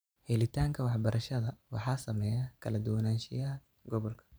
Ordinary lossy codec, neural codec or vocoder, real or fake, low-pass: none; vocoder, 44.1 kHz, 128 mel bands every 512 samples, BigVGAN v2; fake; none